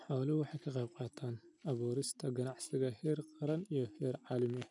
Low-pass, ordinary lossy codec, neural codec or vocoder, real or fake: 10.8 kHz; none; none; real